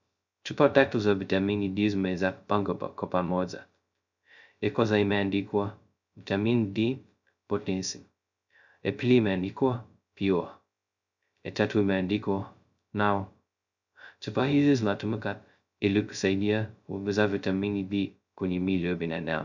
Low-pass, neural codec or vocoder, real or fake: 7.2 kHz; codec, 16 kHz, 0.2 kbps, FocalCodec; fake